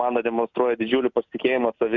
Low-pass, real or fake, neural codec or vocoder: 7.2 kHz; real; none